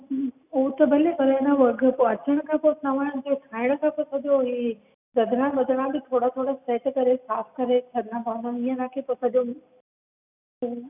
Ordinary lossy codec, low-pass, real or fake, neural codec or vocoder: none; 3.6 kHz; real; none